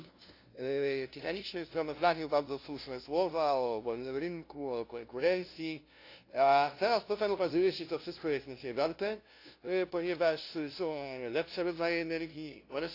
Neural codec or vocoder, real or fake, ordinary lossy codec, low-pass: codec, 16 kHz, 0.5 kbps, FunCodec, trained on LibriTTS, 25 frames a second; fake; AAC, 32 kbps; 5.4 kHz